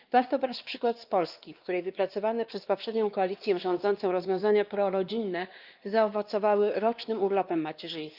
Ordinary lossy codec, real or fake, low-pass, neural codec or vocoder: Opus, 32 kbps; fake; 5.4 kHz; codec, 16 kHz, 2 kbps, X-Codec, WavLM features, trained on Multilingual LibriSpeech